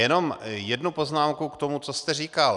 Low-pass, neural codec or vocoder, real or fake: 10.8 kHz; none; real